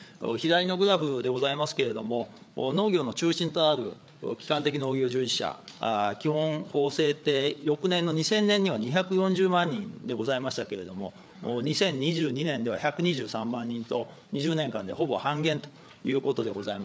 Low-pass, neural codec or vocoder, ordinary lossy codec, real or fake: none; codec, 16 kHz, 4 kbps, FreqCodec, larger model; none; fake